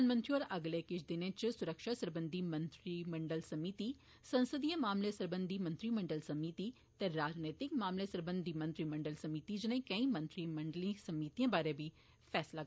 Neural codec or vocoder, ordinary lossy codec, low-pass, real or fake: none; none; none; real